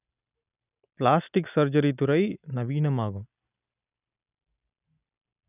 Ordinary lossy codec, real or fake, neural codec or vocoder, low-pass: none; real; none; 3.6 kHz